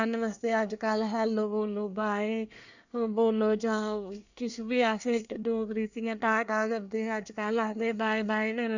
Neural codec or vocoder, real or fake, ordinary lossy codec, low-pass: codec, 24 kHz, 1 kbps, SNAC; fake; none; 7.2 kHz